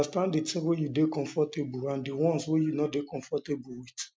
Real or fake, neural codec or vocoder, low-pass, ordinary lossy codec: real; none; none; none